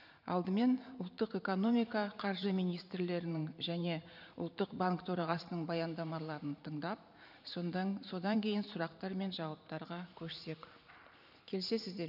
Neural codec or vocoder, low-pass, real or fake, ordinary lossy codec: vocoder, 44.1 kHz, 128 mel bands every 512 samples, BigVGAN v2; 5.4 kHz; fake; none